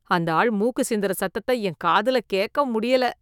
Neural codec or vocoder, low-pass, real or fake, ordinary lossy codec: autoencoder, 48 kHz, 128 numbers a frame, DAC-VAE, trained on Japanese speech; 19.8 kHz; fake; none